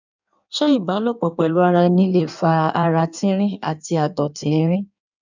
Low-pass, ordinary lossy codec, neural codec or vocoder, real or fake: 7.2 kHz; none; codec, 16 kHz in and 24 kHz out, 1.1 kbps, FireRedTTS-2 codec; fake